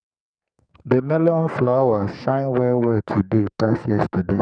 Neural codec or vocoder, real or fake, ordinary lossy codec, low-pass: codec, 44.1 kHz, 2.6 kbps, SNAC; fake; none; 9.9 kHz